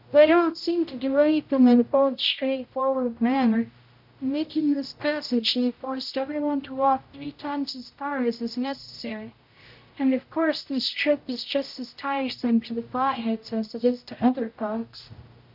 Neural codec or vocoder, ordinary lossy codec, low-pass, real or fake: codec, 16 kHz, 0.5 kbps, X-Codec, HuBERT features, trained on general audio; MP3, 48 kbps; 5.4 kHz; fake